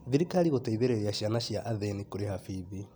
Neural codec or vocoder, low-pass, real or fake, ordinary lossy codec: none; none; real; none